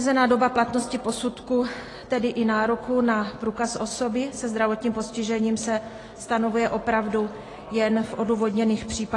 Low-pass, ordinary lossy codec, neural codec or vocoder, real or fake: 10.8 kHz; AAC, 32 kbps; none; real